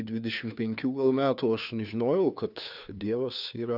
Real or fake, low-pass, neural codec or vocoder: fake; 5.4 kHz; codec, 16 kHz, 2 kbps, FunCodec, trained on Chinese and English, 25 frames a second